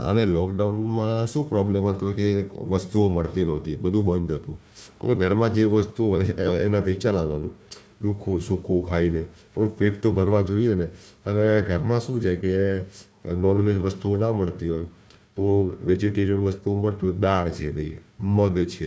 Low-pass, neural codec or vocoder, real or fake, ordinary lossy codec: none; codec, 16 kHz, 1 kbps, FunCodec, trained on Chinese and English, 50 frames a second; fake; none